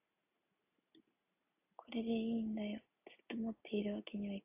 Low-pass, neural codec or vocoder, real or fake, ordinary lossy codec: 3.6 kHz; none; real; AAC, 24 kbps